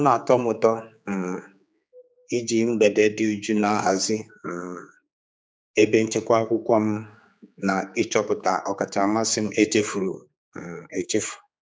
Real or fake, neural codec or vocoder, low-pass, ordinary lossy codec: fake; codec, 16 kHz, 4 kbps, X-Codec, HuBERT features, trained on general audio; none; none